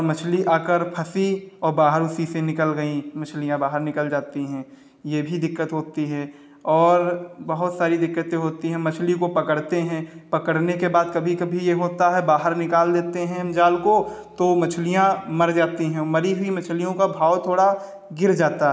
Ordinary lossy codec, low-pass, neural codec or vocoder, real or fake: none; none; none; real